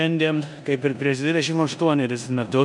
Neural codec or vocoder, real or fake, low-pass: codec, 16 kHz in and 24 kHz out, 0.9 kbps, LongCat-Audio-Codec, four codebook decoder; fake; 10.8 kHz